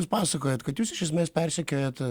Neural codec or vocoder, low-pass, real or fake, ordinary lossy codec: none; 19.8 kHz; real; Opus, 64 kbps